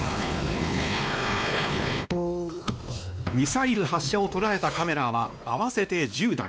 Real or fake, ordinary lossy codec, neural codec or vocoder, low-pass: fake; none; codec, 16 kHz, 2 kbps, X-Codec, WavLM features, trained on Multilingual LibriSpeech; none